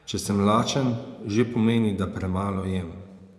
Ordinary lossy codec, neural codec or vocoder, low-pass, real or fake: none; vocoder, 24 kHz, 100 mel bands, Vocos; none; fake